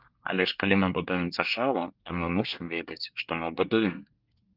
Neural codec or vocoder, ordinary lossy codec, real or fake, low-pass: codec, 24 kHz, 1 kbps, SNAC; Opus, 32 kbps; fake; 5.4 kHz